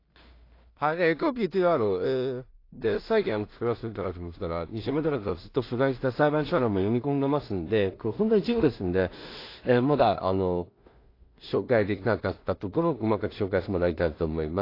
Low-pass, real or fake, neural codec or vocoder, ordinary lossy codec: 5.4 kHz; fake; codec, 16 kHz in and 24 kHz out, 0.4 kbps, LongCat-Audio-Codec, two codebook decoder; AAC, 32 kbps